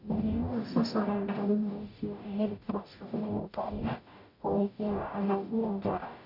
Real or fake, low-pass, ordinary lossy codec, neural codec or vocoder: fake; 5.4 kHz; none; codec, 44.1 kHz, 0.9 kbps, DAC